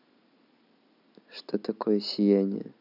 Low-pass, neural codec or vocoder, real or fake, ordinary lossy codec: 5.4 kHz; none; real; none